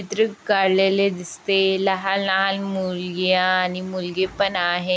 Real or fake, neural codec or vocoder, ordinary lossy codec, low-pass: real; none; none; none